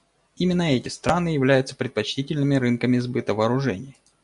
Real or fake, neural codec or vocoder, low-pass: real; none; 10.8 kHz